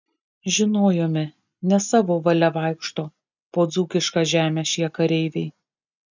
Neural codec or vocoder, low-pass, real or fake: none; 7.2 kHz; real